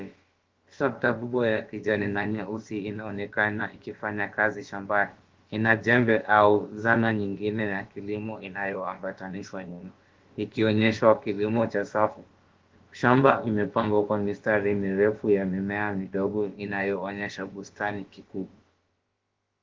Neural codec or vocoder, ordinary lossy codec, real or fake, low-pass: codec, 16 kHz, about 1 kbps, DyCAST, with the encoder's durations; Opus, 16 kbps; fake; 7.2 kHz